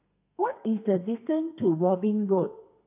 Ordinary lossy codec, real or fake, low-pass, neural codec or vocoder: AAC, 32 kbps; fake; 3.6 kHz; codec, 32 kHz, 1.9 kbps, SNAC